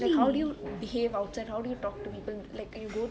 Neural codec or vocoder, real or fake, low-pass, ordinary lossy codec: none; real; none; none